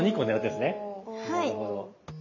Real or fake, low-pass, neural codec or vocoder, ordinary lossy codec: real; 7.2 kHz; none; none